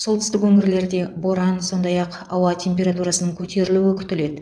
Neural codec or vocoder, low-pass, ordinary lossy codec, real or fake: vocoder, 22.05 kHz, 80 mel bands, WaveNeXt; 9.9 kHz; none; fake